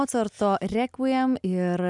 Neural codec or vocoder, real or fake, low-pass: none; real; 10.8 kHz